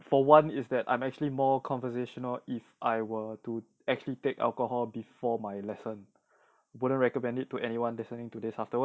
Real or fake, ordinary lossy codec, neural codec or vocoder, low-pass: real; none; none; none